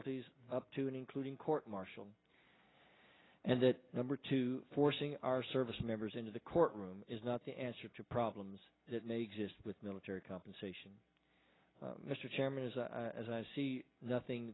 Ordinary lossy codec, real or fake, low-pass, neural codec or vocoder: AAC, 16 kbps; real; 7.2 kHz; none